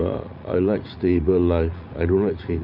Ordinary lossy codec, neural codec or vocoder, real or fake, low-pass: none; vocoder, 44.1 kHz, 80 mel bands, Vocos; fake; 5.4 kHz